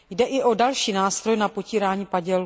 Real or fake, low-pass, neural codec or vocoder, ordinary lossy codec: real; none; none; none